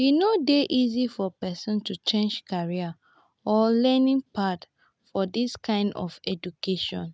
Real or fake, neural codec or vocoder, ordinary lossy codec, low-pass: real; none; none; none